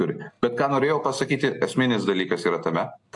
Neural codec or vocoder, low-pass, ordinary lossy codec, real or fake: none; 10.8 kHz; AAC, 64 kbps; real